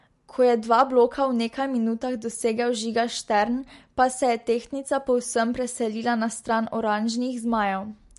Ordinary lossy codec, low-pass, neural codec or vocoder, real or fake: MP3, 48 kbps; 14.4 kHz; none; real